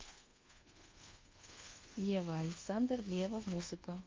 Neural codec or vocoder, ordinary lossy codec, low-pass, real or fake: codec, 24 kHz, 1.2 kbps, DualCodec; Opus, 32 kbps; 7.2 kHz; fake